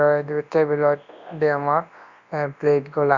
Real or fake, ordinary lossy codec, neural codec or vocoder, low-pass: fake; Opus, 64 kbps; codec, 24 kHz, 0.9 kbps, WavTokenizer, large speech release; 7.2 kHz